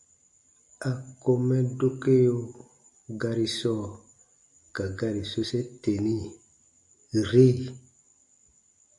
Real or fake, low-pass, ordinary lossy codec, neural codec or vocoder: real; 10.8 kHz; MP3, 64 kbps; none